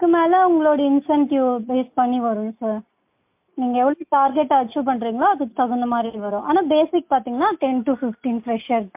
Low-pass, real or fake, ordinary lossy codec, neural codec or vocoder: 3.6 kHz; real; MP3, 32 kbps; none